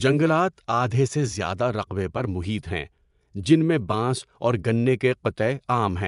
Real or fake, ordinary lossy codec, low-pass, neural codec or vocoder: fake; none; 10.8 kHz; vocoder, 24 kHz, 100 mel bands, Vocos